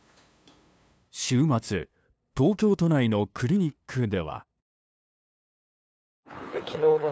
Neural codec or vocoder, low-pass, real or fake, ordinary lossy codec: codec, 16 kHz, 2 kbps, FunCodec, trained on LibriTTS, 25 frames a second; none; fake; none